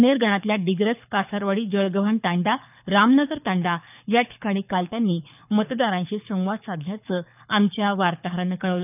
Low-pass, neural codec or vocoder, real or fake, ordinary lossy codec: 3.6 kHz; codec, 24 kHz, 6 kbps, HILCodec; fake; none